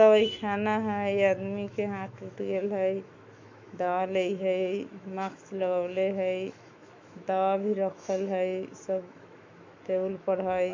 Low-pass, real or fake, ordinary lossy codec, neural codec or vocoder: 7.2 kHz; fake; MP3, 48 kbps; autoencoder, 48 kHz, 128 numbers a frame, DAC-VAE, trained on Japanese speech